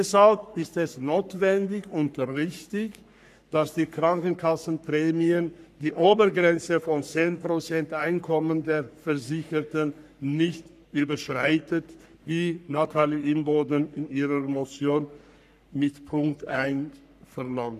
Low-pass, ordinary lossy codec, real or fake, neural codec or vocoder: 14.4 kHz; none; fake; codec, 44.1 kHz, 3.4 kbps, Pupu-Codec